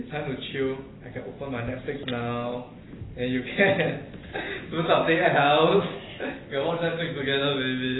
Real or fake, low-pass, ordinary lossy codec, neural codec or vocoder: real; 7.2 kHz; AAC, 16 kbps; none